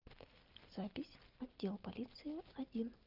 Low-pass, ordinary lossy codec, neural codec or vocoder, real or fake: 5.4 kHz; Opus, 24 kbps; none; real